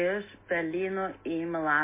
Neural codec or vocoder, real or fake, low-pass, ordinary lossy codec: none; real; 3.6 kHz; MP3, 16 kbps